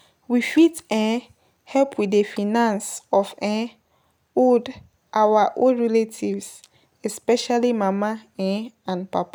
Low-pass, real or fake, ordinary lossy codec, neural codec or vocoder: none; real; none; none